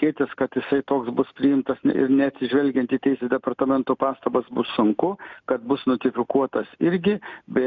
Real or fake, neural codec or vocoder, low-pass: real; none; 7.2 kHz